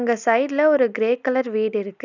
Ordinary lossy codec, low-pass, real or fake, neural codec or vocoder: none; 7.2 kHz; real; none